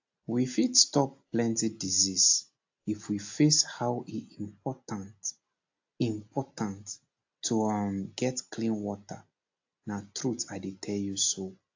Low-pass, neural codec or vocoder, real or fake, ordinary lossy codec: 7.2 kHz; none; real; AAC, 48 kbps